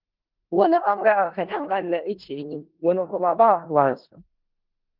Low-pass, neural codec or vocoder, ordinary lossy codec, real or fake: 5.4 kHz; codec, 16 kHz in and 24 kHz out, 0.4 kbps, LongCat-Audio-Codec, four codebook decoder; Opus, 16 kbps; fake